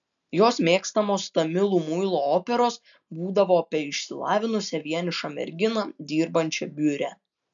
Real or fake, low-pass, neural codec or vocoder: real; 7.2 kHz; none